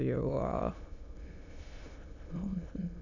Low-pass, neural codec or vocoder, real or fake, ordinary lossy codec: 7.2 kHz; autoencoder, 22.05 kHz, a latent of 192 numbers a frame, VITS, trained on many speakers; fake; none